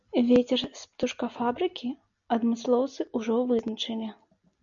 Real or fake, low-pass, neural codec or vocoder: real; 7.2 kHz; none